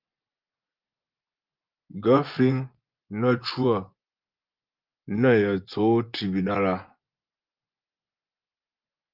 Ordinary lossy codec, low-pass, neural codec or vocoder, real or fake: Opus, 32 kbps; 5.4 kHz; vocoder, 24 kHz, 100 mel bands, Vocos; fake